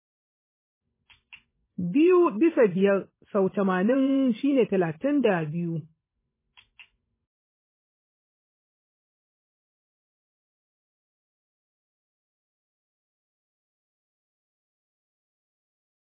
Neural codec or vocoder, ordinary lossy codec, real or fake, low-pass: vocoder, 24 kHz, 100 mel bands, Vocos; MP3, 16 kbps; fake; 3.6 kHz